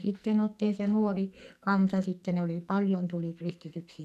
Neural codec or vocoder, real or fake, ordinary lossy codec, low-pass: codec, 44.1 kHz, 2.6 kbps, SNAC; fake; none; 14.4 kHz